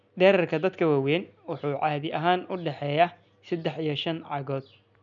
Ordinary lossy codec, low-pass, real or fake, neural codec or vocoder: none; 7.2 kHz; real; none